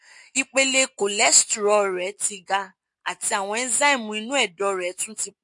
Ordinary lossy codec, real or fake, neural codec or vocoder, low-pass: MP3, 48 kbps; real; none; 10.8 kHz